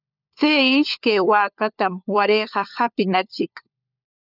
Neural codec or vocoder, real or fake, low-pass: codec, 16 kHz, 4 kbps, FunCodec, trained on LibriTTS, 50 frames a second; fake; 5.4 kHz